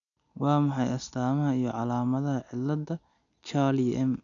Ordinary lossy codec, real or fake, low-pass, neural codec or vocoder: none; real; 7.2 kHz; none